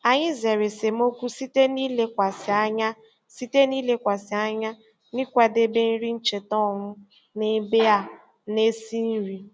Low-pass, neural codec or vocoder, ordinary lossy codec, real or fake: none; none; none; real